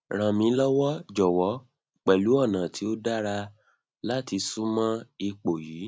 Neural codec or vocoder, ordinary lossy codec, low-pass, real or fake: none; none; none; real